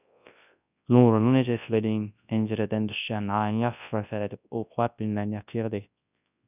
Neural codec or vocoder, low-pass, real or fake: codec, 24 kHz, 0.9 kbps, WavTokenizer, large speech release; 3.6 kHz; fake